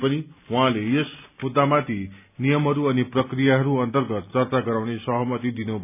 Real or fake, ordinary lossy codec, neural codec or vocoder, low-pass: real; none; none; 3.6 kHz